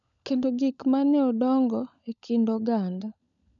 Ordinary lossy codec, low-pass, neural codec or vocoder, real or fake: none; 7.2 kHz; codec, 16 kHz, 16 kbps, FunCodec, trained on LibriTTS, 50 frames a second; fake